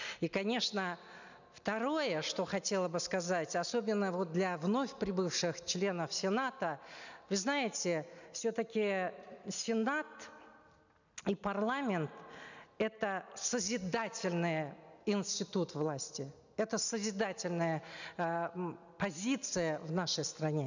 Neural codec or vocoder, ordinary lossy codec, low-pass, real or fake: none; none; 7.2 kHz; real